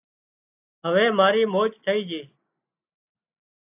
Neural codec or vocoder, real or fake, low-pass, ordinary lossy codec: none; real; 3.6 kHz; AAC, 24 kbps